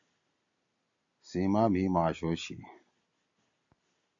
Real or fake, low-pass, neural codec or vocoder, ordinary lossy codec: real; 7.2 kHz; none; AAC, 64 kbps